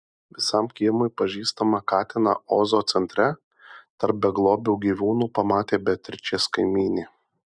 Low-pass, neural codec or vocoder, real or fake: 9.9 kHz; none; real